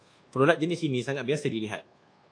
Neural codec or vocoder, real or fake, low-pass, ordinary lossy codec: codec, 24 kHz, 1.2 kbps, DualCodec; fake; 9.9 kHz; AAC, 48 kbps